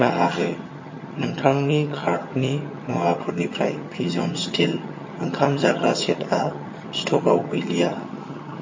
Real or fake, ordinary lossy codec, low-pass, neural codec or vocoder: fake; MP3, 32 kbps; 7.2 kHz; vocoder, 22.05 kHz, 80 mel bands, HiFi-GAN